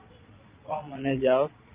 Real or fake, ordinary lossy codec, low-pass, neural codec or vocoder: fake; Opus, 24 kbps; 3.6 kHz; vocoder, 22.05 kHz, 80 mel bands, Vocos